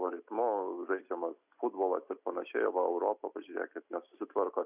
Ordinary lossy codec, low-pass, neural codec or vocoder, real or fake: Opus, 64 kbps; 3.6 kHz; none; real